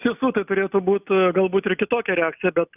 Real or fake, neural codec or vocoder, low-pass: real; none; 3.6 kHz